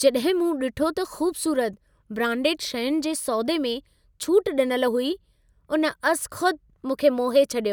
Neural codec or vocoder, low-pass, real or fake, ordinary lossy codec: none; none; real; none